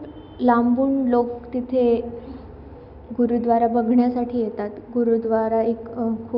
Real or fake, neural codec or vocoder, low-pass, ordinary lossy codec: real; none; 5.4 kHz; none